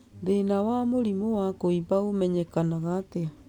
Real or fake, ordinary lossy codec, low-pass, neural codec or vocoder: real; none; 19.8 kHz; none